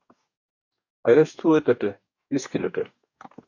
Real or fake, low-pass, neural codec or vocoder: fake; 7.2 kHz; codec, 44.1 kHz, 2.6 kbps, DAC